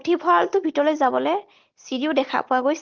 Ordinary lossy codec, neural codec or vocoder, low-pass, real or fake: Opus, 16 kbps; none; 7.2 kHz; real